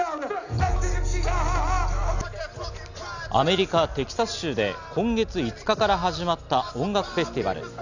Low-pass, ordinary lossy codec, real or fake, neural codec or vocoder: 7.2 kHz; none; real; none